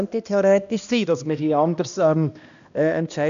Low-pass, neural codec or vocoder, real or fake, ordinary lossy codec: 7.2 kHz; codec, 16 kHz, 1 kbps, X-Codec, HuBERT features, trained on balanced general audio; fake; none